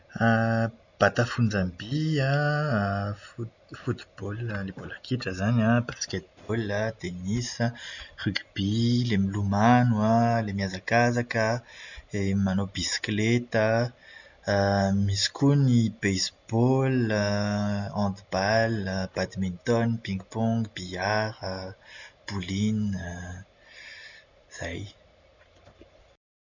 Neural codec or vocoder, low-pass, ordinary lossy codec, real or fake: none; 7.2 kHz; none; real